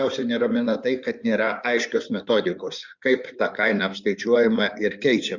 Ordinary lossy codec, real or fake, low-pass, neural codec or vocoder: Opus, 64 kbps; fake; 7.2 kHz; codec, 16 kHz in and 24 kHz out, 2.2 kbps, FireRedTTS-2 codec